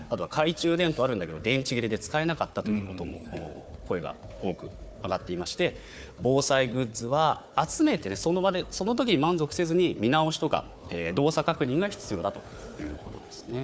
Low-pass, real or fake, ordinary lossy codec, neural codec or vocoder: none; fake; none; codec, 16 kHz, 4 kbps, FunCodec, trained on Chinese and English, 50 frames a second